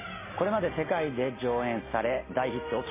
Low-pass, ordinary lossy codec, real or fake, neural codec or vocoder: 3.6 kHz; MP3, 16 kbps; real; none